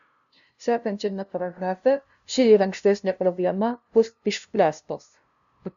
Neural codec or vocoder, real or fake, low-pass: codec, 16 kHz, 0.5 kbps, FunCodec, trained on LibriTTS, 25 frames a second; fake; 7.2 kHz